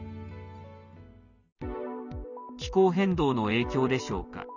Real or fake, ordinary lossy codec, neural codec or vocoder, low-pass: real; none; none; 7.2 kHz